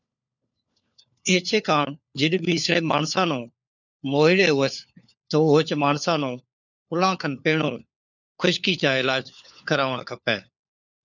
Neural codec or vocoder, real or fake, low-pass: codec, 16 kHz, 4 kbps, FunCodec, trained on LibriTTS, 50 frames a second; fake; 7.2 kHz